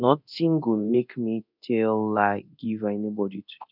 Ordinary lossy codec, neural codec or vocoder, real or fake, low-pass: none; codec, 24 kHz, 0.9 kbps, DualCodec; fake; 5.4 kHz